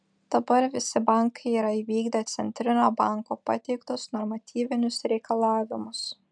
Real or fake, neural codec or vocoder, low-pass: real; none; 9.9 kHz